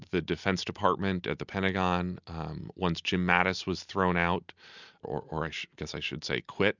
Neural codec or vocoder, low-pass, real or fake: none; 7.2 kHz; real